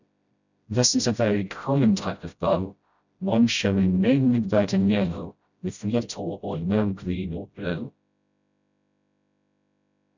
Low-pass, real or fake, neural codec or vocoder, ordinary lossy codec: 7.2 kHz; fake; codec, 16 kHz, 0.5 kbps, FreqCodec, smaller model; none